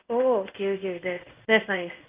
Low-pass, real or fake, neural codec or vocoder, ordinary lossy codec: 3.6 kHz; fake; codec, 16 kHz in and 24 kHz out, 1 kbps, XY-Tokenizer; Opus, 24 kbps